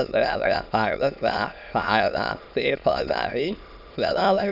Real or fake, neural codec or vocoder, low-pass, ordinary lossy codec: fake; autoencoder, 22.05 kHz, a latent of 192 numbers a frame, VITS, trained on many speakers; 5.4 kHz; none